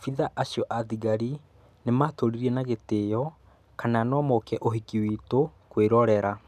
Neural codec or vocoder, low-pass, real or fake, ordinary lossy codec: none; 14.4 kHz; real; none